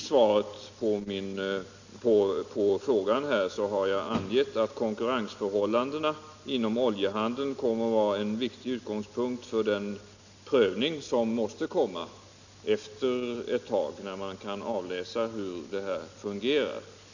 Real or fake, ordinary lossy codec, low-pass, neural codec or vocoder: real; none; 7.2 kHz; none